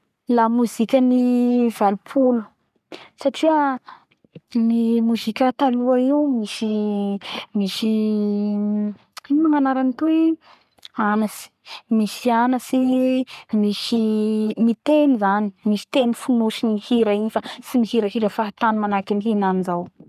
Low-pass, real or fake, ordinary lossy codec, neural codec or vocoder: 14.4 kHz; fake; none; codec, 44.1 kHz, 3.4 kbps, Pupu-Codec